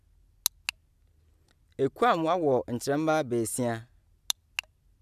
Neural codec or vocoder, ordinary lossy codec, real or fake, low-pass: none; none; real; 14.4 kHz